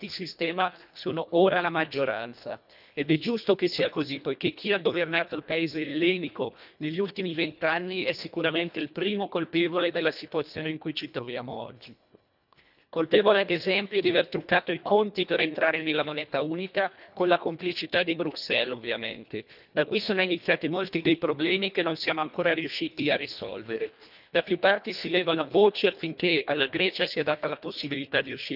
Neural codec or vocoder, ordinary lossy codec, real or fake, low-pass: codec, 24 kHz, 1.5 kbps, HILCodec; none; fake; 5.4 kHz